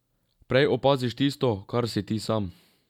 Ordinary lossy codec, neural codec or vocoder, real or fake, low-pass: none; none; real; 19.8 kHz